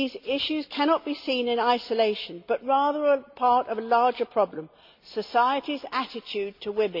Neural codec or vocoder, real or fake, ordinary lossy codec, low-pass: none; real; MP3, 48 kbps; 5.4 kHz